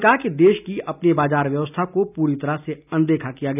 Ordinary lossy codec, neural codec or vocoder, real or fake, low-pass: none; none; real; 3.6 kHz